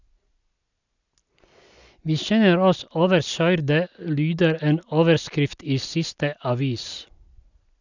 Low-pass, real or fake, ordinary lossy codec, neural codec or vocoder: 7.2 kHz; real; none; none